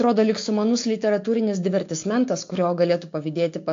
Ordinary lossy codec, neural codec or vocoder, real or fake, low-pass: AAC, 48 kbps; codec, 16 kHz, 6 kbps, DAC; fake; 7.2 kHz